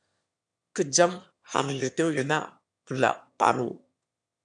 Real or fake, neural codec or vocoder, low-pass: fake; autoencoder, 22.05 kHz, a latent of 192 numbers a frame, VITS, trained on one speaker; 9.9 kHz